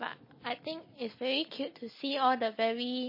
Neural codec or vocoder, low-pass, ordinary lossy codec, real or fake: none; 5.4 kHz; MP3, 24 kbps; real